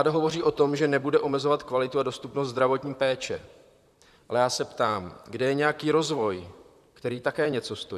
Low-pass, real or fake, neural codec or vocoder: 14.4 kHz; fake; vocoder, 44.1 kHz, 128 mel bands, Pupu-Vocoder